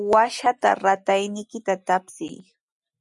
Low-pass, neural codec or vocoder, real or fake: 10.8 kHz; none; real